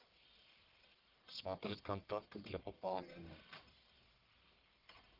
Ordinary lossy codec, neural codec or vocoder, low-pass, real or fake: Opus, 32 kbps; codec, 44.1 kHz, 1.7 kbps, Pupu-Codec; 5.4 kHz; fake